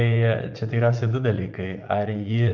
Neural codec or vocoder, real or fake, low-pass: vocoder, 44.1 kHz, 80 mel bands, Vocos; fake; 7.2 kHz